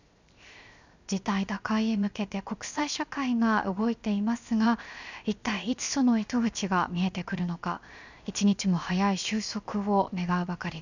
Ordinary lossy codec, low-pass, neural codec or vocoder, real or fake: none; 7.2 kHz; codec, 16 kHz, 0.7 kbps, FocalCodec; fake